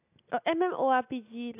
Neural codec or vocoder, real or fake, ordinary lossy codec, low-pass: none; real; AAC, 24 kbps; 3.6 kHz